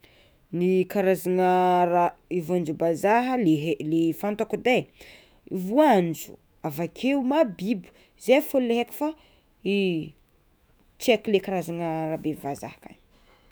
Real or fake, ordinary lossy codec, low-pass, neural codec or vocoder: fake; none; none; autoencoder, 48 kHz, 128 numbers a frame, DAC-VAE, trained on Japanese speech